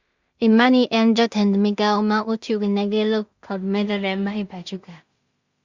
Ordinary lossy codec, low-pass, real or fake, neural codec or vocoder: Opus, 64 kbps; 7.2 kHz; fake; codec, 16 kHz in and 24 kHz out, 0.4 kbps, LongCat-Audio-Codec, two codebook decoder